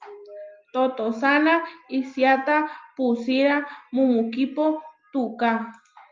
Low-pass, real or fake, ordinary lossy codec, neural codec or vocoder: 7.2 kHz; real; Opus, 24 kbps; none